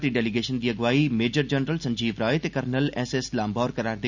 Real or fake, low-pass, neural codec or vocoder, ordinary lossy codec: real; 7.2 kHz; none; none